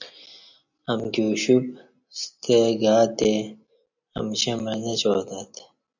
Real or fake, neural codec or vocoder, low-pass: real; none; 7.2 kHz